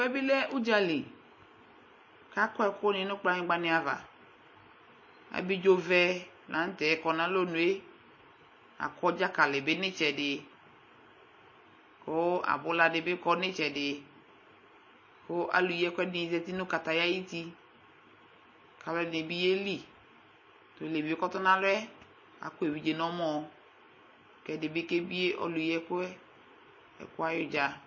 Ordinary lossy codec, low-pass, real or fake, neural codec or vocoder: MP3, 32 kbps; 7.2 kHz; real; none